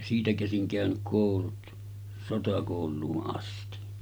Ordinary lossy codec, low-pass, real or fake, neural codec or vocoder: none; none; real; none